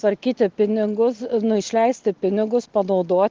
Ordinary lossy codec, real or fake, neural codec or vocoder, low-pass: Opus, 16 kbps; real; none; 7.2 kHz